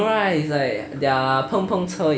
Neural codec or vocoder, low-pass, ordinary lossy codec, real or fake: none; none; none; real